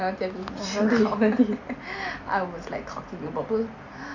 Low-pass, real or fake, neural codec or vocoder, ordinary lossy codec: 7.2 kHz; real; none; none